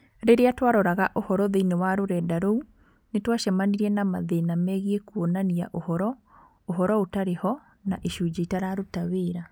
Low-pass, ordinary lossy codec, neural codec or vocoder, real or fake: none; none; none; real